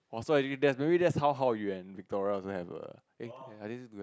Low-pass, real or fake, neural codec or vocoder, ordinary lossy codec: none; real; none; none